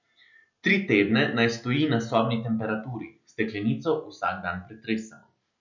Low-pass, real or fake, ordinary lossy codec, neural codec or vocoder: 7.2 kHz; real; none; none